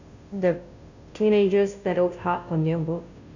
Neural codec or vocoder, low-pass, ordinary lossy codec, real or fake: codec, 16 kHz, 0.5 kbps, FunCodec, trained on Chinese and English, 25 frames a second; 7.2 kHz; none; fake